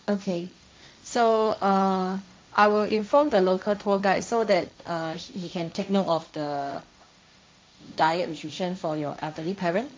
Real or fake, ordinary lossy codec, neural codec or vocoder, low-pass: fake; none; codec, 16 kHz, 1.1 kbps, Voila-Tokenizer; none